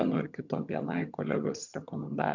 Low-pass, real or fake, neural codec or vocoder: 7.2 kHz; fake; vocoder, 22.05 kHz, 80 mel bands, HiFi-GAN